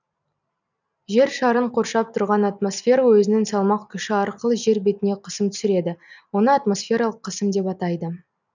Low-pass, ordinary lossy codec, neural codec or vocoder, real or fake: 7.2 kHz; none; none; real